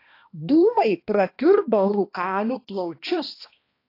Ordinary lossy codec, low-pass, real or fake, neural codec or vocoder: AAC, 32 kbps; 5.4 kHz; fake; codec, 16 kHz, 1 kbps, X-Codec, HuBERT features, trained on balanced general audio